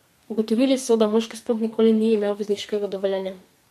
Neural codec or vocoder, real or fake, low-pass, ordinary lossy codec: codec, 32 kHz, 1.9 kbps, SNAC; fake; 14.4 kHz; MP3, 64 kbps